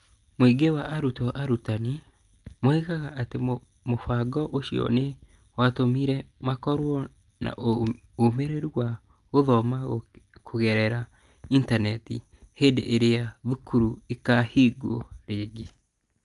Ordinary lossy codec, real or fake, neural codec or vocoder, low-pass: Opus, 32 kbps; real; none; 10.8 kHz